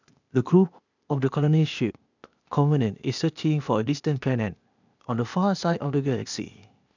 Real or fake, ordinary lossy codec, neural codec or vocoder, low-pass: fake; none; codec, 16 kHz, 0.8 kbps, ZipCodec; 7.2 kHz